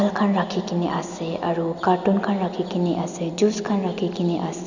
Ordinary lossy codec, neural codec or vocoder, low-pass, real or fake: none; none; 7.2 kHz; real